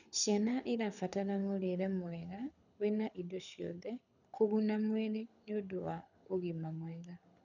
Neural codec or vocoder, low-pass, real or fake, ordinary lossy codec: codec, 24 kHz, 6 kbps, HILCodec; 7.2 kHz; fake; none